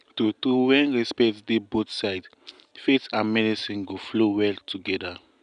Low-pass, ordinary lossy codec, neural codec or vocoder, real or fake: 9.9 kHz; none; none; real